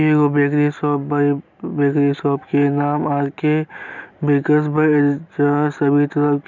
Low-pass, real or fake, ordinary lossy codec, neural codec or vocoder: 7.2 kHz; real; none; none